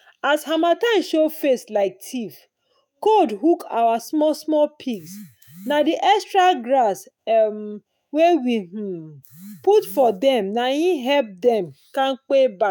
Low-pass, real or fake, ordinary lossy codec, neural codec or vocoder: none; fake; none; autoencoder, 48 kHz, 128 numbers a frame, DAC-VAE, trained on Japanese speech